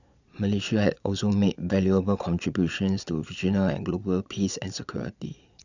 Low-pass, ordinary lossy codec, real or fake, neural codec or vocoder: 7.2 kHz; none; fake; vocoder, 22.05 kHz, 80 mel bands, Vocos